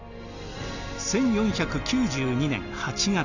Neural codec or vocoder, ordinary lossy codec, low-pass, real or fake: none; none; 7.2 kHz; real